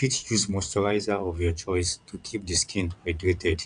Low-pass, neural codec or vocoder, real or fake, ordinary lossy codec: 9.9 kHz; vocoder, 22.05 kHz, 80 mel bands, WaveNeXt; fake; none